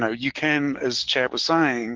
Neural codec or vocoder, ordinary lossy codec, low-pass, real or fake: codec, 44.1 kHz, 7.8 kbps, DAC; Opus, 16 kbps; 7.2 kHz; fake